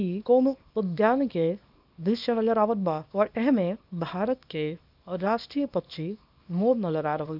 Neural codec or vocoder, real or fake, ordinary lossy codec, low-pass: codec, 24 kHz, 0.9 kbps, WavTokenizer, small release; fake; none; 5.4 kHz